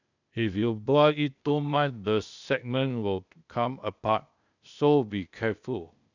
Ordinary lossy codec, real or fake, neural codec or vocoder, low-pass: none; fake; codec, 16 kHz, 0.8 kbps, ZipCodec; 7.2 kHz